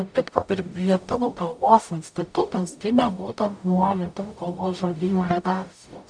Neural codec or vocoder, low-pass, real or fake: codec, 44.1 kHz, 0.9 kbps, DAC; 9.9 kHz; fake